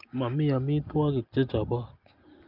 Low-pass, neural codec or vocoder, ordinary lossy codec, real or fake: 5.4 kHz; none; Opus, 32 kbps; real